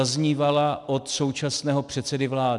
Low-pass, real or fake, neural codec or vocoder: 10.8 kHz; real; none